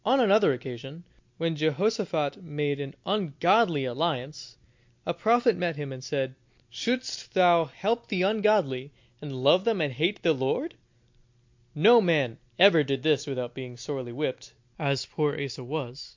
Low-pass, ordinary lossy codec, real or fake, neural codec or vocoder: 7.2 kHz; MP3, 48 kbps; real; none